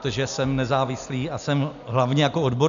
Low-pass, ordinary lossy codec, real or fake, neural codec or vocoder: 7.2 kHz; MP3, 96 kbps; real; none